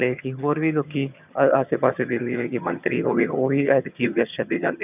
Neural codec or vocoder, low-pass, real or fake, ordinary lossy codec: vocoder, 22.05 kHz, 80 mel bands, HiFi-GAN; 3.6 kHz; fake; none